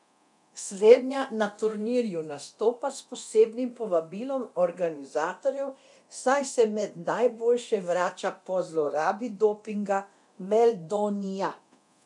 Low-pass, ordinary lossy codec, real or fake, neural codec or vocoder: 10.8 kHz; none; fake; codec, 24 kHz, 0.9 kbps, DualCodec